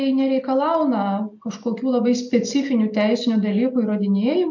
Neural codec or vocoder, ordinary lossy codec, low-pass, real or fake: none; MP3, 64 kbps; 7.2 kHz; real